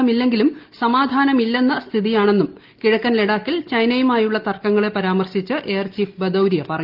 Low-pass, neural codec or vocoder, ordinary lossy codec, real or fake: 5.4 kHz; none; Opus, 32 kbps; real